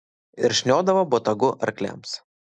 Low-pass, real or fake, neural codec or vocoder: 9.9 kHz; real; none